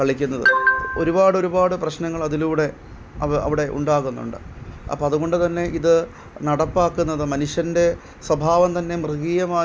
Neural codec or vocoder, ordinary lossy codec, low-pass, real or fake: none; none; none; real